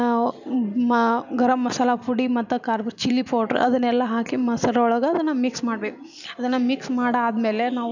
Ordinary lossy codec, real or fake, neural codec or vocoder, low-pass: none; real; none; 7.2 kHz